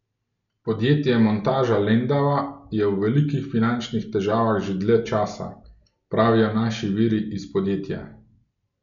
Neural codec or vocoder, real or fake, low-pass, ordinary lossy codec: none; real; 7.2 kHz; none